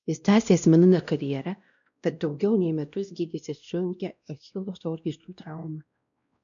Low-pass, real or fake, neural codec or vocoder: 7.2 kHz; fake; codec, 16 kHz, 1 kbps, X-Codec, WavLM features, trained on Multilingual LibriSpeech